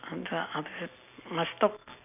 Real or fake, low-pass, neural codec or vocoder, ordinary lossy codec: real; 3.6 kHz; none; none